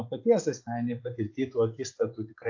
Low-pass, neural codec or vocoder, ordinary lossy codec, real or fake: 7.2 kHz; vocoder, 24 kHz, 100 mel bands, Vocos; AAC, 48 kbps; fake